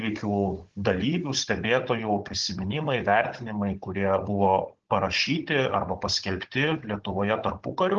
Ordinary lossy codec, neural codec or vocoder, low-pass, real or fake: Opus, 16 kbps; codec, 16 kHz, 16 kbps, FunCodec, trained on Chinese and English, 50 frames a second; 7.2 kHz; fake